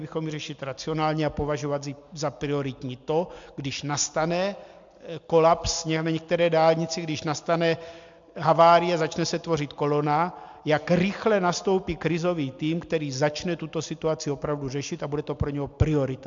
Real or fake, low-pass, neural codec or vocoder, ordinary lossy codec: real; 7.2 kHz; none; MP3, 64 kbps